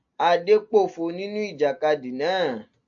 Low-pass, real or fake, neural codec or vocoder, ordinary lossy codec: 7.2 kHz; real; none; AAC, 64 kbps